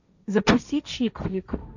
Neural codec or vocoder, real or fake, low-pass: codec, 16 kHz, 1.1 kbps, Voila-Tokenizer; fake; 7.2 kHz